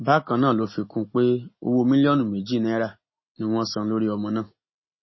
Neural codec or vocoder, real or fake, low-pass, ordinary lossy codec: none; real; 7.2 kHz; MP3, 24 kbps